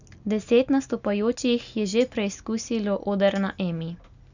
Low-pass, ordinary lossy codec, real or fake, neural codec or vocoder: 7.2 kHz; none; real; none